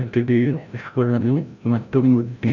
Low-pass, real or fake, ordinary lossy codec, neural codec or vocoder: 7.2 kHz; fake; none; codec, 16 kHz, 0.5 kbps, FreqCodec, larger model